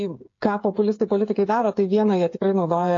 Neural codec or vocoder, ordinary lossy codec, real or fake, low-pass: codec, 16 kHz, 8 kbps, FreqCodec, smaller model; AAC, 48 kbps; fake; 7.2 kHz